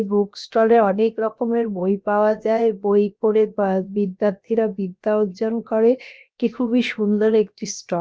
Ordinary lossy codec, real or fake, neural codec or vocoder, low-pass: none; fake; codec, 16 kHz, 0.7 kbps, FocalCodec; none